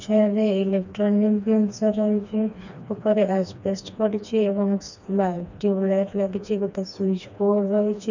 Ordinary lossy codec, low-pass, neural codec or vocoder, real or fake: none; 7.2 kHz; codec, 16 kHz, 2 kbps, FreqCodec, smaller model; fake